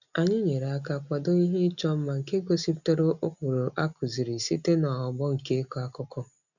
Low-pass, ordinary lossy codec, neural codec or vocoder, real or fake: 7.2 kHz; none; none; real